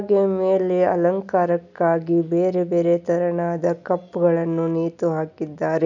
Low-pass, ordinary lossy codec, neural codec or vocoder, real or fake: 7.2 kHz; none; none; real